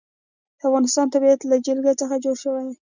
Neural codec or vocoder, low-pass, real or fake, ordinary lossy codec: none; 7.2 kHz; real; Opus, 64 kbps